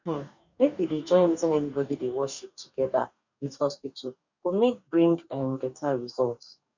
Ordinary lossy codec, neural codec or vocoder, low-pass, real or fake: none; codec, 44.1 kHz, 2.6 kbps, DAC; 7.2 kHz; fake